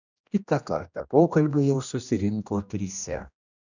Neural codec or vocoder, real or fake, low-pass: codec, 16 kHz, 1 kbps, X-Codec, HuBERT features, trained on general audio; fake; 7.2 kHz